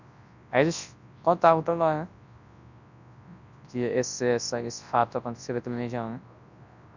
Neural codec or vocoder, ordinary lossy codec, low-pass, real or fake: codec, 24 kHz, 0.9 kbps, WavTokenizer, large speech release; none; 7.2 kHz; fake